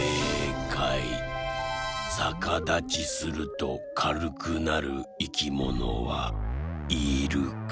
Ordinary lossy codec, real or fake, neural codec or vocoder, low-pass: none; real; none; none